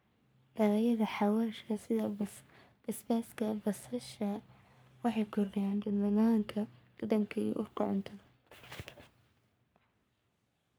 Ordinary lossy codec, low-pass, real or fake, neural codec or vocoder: none; none; fake; codec, 44.1 kHz, 3.4 kbps, Pupu-Codec